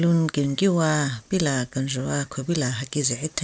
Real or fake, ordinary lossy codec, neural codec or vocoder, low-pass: real; none; none; none